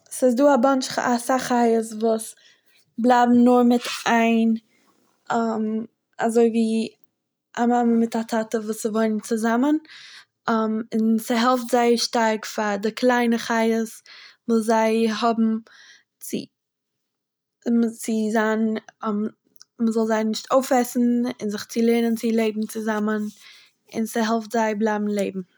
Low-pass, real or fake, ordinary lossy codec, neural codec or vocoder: none; real; none; none